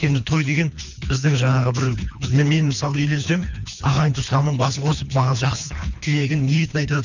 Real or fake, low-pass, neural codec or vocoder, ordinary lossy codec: fake; 7.2 kHz; codec, 24 kHz, 3 kbps, HILCodec; none